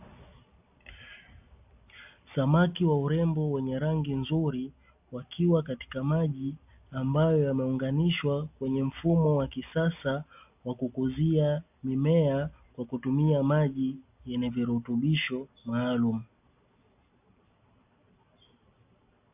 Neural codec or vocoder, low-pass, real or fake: none; 3.6 kHz; real